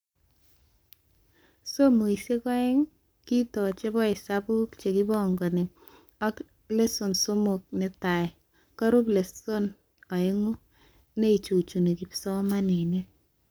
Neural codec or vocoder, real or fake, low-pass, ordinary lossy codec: codec, 44.1 kHz, 7.8 kbps, Pupu-Codec; fake; none; none